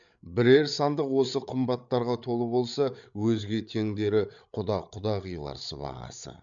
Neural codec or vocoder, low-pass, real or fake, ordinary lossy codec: codec, 16 kHz, 8 kbps, FreqCodec, larger model; 7.2 kHz; fake; none